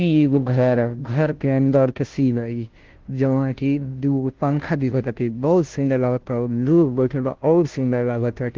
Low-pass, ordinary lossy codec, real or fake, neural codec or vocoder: 7.2 kHz; Opus, 16 kbps; fake; codec, 16 kHz, 0.5 kbps, FunCodec, trained on Chinese and English, 25 frames a second